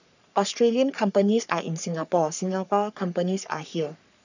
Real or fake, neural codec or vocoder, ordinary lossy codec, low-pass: fake; codec, 44.1 kHz, 3.4 kbps, Pupu-Codec; none; 7.2 kHz